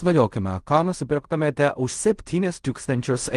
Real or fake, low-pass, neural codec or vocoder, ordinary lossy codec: fake; 10.8 kHz; codec, 16 kHz in and 24 kHz out, 0.4 kbps, LongCat-Audio-Codec, fine tuned four codebook decoder; Opus, 24 kbps